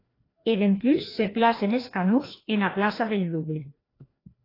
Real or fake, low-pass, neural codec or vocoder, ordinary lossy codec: fake; 5.4 kHz; codec, 16 kHz, 1 kbps, FreqCodec, larger model; AAC, 24 kbps